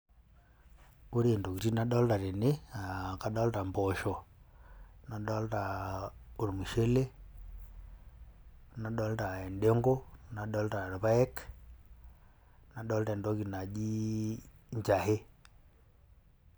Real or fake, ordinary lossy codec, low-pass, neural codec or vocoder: real; none; none; none